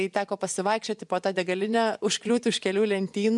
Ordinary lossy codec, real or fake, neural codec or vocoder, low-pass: MP3, 96 kbps; fake; vocoder, 24 kHz, 100 mel bands, Vocos; 10.8 kHz